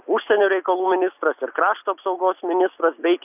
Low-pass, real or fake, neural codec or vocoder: 3.6 kHz; real; none